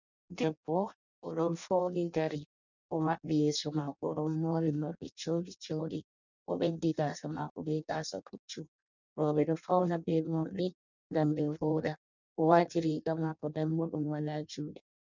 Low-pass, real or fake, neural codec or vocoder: 7.2 kHz; fake; codec, 16 kHz in and 24 kHz out, 0.6 kbps, FireRedTTS-2 codec